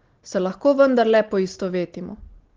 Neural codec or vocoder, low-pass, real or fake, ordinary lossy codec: none; 7.2 kHz; real; Opus, 16 kbps